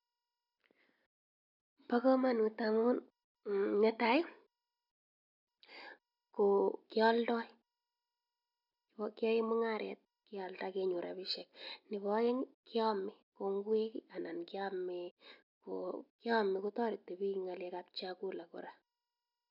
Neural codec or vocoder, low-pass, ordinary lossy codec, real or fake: none; 5.4 kHz; none; real